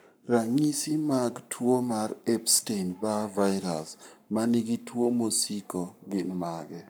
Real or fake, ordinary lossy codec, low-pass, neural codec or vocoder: fake; none; none; codec, 44.1 kHz, 7.8 kbps, Pupu-Codec